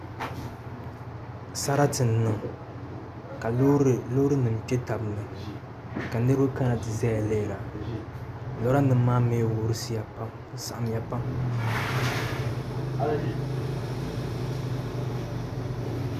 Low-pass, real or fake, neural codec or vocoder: 14.4 kHz; real; none